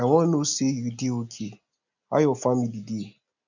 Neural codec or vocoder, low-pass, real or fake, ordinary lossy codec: none; 7.2 kHz; real; none